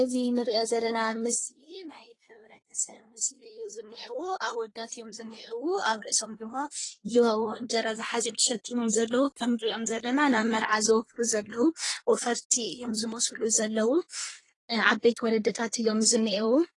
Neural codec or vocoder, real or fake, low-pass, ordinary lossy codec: codec, 24 kHz, 1 kbps, SNAC; fake; 10.8 kHz; AAC, 32 kbps